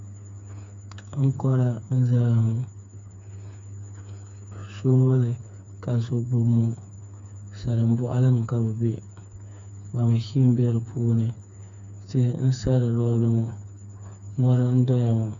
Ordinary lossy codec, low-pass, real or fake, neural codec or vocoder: MP3, 64 kbps; 7.2 kHz; fake; codec, 16 kHz, 4 kbps, FreqCodec, smaller model